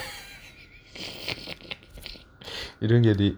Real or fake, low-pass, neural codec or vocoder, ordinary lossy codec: real; none; none; none